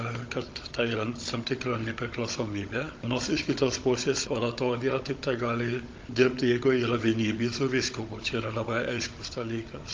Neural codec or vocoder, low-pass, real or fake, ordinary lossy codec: codec, 16 kHz, 16 kbps, FunCodec, trained on Chinese and English, 50 frames a second; 7.2 kHz; fake; Opus, 24 kbps